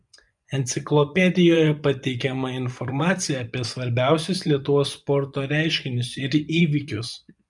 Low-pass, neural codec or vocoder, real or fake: 10.8 kHz; vocoder, 24 kHz, 100 mel bands, Vocos; fake